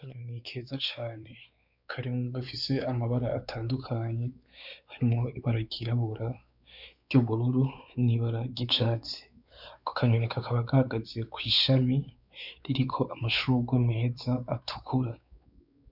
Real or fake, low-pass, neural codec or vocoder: fake; 5.4 kHz; codec, 16 kHz, 4 kbps, X-Codec, WavLM features, trained on Multilingual LibriSpeech